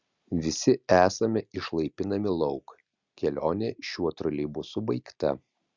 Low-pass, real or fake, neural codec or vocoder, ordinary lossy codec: 7.2 kHz; real; none; Opus, 64 kbps